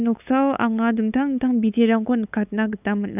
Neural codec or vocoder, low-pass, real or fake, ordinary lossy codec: codec, 16 kHz, 8 kbps, FunCodec, trained on LibriTTS, 25 frames a second; 3.6 kHz; fake; none